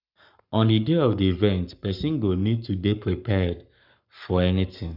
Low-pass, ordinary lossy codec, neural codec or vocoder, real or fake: 5.4 kHz; none; codec, 44.1 kHz, 7.8 kbps, Pupu-Codec; fake